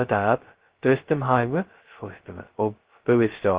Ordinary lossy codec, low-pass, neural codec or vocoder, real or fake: Opus, 16 kbps; 3.6 kHz; codec, 16 kHz, 0.2 kbps, FocalCodec; fake